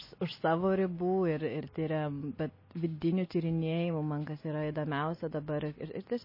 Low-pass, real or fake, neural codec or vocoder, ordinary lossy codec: 5.4 kHz; real; none; MP3, 24 kbps